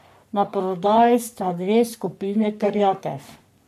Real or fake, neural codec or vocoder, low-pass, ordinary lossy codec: fake; codec, 44.1 kHz, 3.4 kbps, Pupu-Codec; 14.4 kHz; none